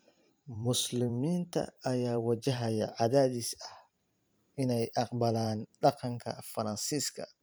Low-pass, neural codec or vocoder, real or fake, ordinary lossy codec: none; vocoder, 44.1 kHz, 128 mel bands every 512 samples, BigVGAN v2; fake; none